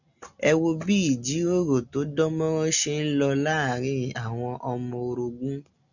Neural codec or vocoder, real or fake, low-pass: none; real; 7.2 kHz